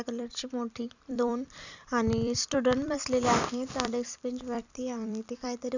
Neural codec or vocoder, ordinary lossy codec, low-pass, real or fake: vocoder, 44.1 kHz, 128 mel bands every 256 samples, BigVGAN v2; none; 7.2 kHz; fake